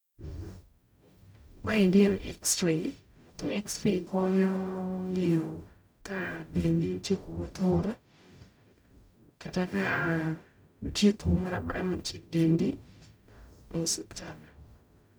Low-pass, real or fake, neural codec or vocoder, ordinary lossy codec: none; fake; codec, 44.1 kHz, 0.9 kbps, DAC; none